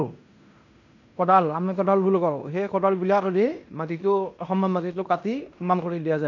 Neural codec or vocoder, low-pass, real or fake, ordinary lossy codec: codec, 16 kHz in and 24 kHz out, 0.9 kbps, LongCat-Audio-Codec, fine tuned four codebook decoder; 7.2 kHz; fake; none